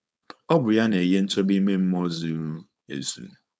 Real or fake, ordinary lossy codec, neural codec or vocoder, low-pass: fake; none; codec, 16 kHz, 4.8 kbps, FACodec; none